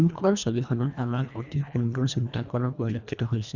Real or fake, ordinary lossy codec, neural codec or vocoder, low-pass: fake; none; codec, 24 kHz, 1.5 kbps, HILCodec; 7.2 kHz